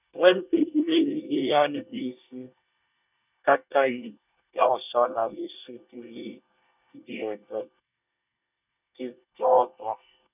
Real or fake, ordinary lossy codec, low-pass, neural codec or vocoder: fake; none; 3.6 kHz; codec, 24 kHz, 1 kbps, SNAC